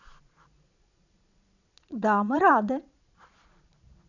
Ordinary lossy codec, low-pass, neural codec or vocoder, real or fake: none; 7.2 kHz; vocoder, 22.05 kHz, 80 mel bands, Vocos; fake